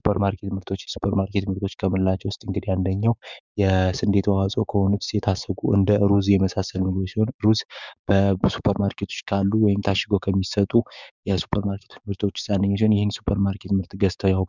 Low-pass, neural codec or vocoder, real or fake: 7.2 kHz; codec, 16 kHz, 6 kbps, DAC; fake